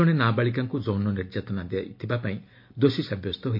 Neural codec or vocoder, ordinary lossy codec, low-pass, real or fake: none; MP3, 32 kbps; 5.4 kHz; real